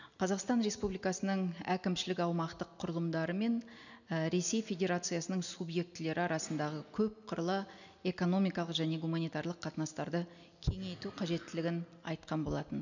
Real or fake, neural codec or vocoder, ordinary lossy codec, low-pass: real; none; none; 7.2 kHz